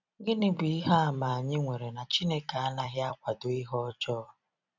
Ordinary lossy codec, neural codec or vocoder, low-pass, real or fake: none; vocoder, 44.1 kHz, 128 mel bands every 256 samples, BigVGAN v2; 7.2 kHz; fake